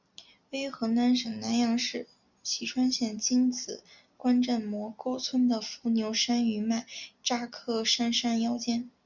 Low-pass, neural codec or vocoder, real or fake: 7.2 kHz; none; real